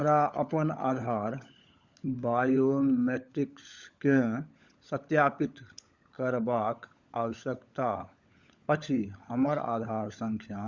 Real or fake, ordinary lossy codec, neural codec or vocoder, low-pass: fake; Opus, 64 kbps; codec, 16 kHz, 16 kbps, FunCodec, trained on LibriTTS, 50 frames a second; 7.2 kHz